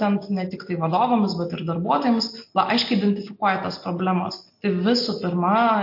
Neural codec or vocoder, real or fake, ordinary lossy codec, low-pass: none; real; MP3, 32 kbps; 5.4 kHz